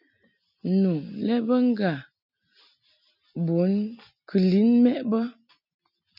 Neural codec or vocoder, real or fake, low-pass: none; real; 5.4 kHz